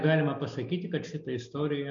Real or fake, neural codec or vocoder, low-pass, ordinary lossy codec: real; none; 7.2 kHz; MP3, 48 kbps